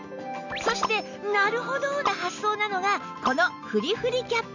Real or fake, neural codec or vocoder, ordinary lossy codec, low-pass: real; none; none; 7.2 kHz